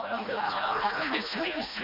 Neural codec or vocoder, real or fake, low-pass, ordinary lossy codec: codec, 16 kHz, 1 kbps, FreqCodec, smaller model; fake; 5.4 kHz; MP3, 48 kbps